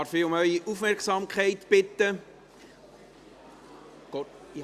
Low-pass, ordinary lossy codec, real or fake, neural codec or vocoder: 14.4 kHz; Opus, 64 kbps; real; none